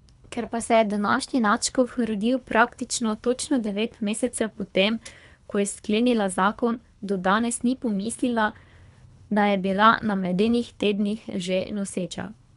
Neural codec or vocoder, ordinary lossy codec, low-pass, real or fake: codec, 24 kHz, 3 kbps, HILCodec; none; 10.8 kHz; fake